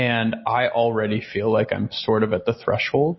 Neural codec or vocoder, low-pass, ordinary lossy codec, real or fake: none; 7.2 kHz; MP3, 24 kbps; real